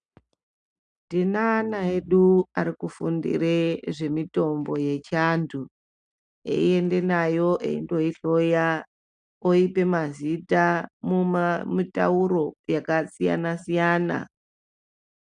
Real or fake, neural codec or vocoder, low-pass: real; none; 9.9 kHz